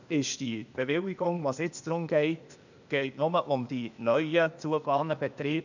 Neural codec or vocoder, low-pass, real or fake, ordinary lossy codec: codec, 16 kHz, 0.8 kbps, ZipCodec; 7.2 kHz; fake; none